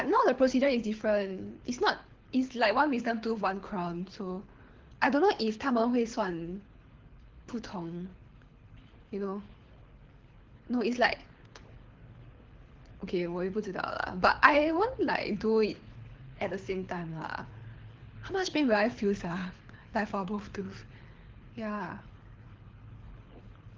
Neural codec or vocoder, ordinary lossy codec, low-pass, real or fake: codec, 24 kHz, 6 kbps, HILCodec; Opus, 16 kbps; 7.2 kHz; fake